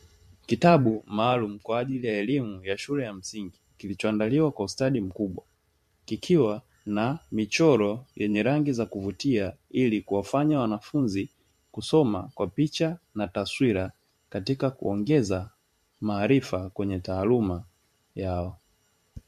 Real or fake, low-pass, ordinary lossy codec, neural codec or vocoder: fake; 14.4 kHz; MP3, 64 kbps; vocoder, 44.1 kHz, 128 mel bands every 512 samples, BigVGAN v2